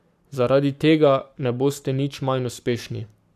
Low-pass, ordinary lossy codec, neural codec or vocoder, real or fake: 14.4 kHz; AAC, 96 kbps; codec, 44.1 kHz, 7.8 kbps, Pupu-Codec; fake